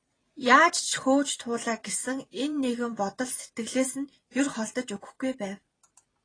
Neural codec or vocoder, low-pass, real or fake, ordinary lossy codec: vocoder, 44.1 kHz, 128 mel bands every 256 samples, BigVGAN v2; 9.9 kHz; fake; AAC, 32 kbps